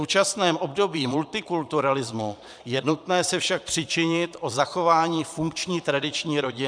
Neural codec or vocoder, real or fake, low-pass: vocoder, 22.05 kHz, 80 mel bands, Vocos; fake; 9.9 kHz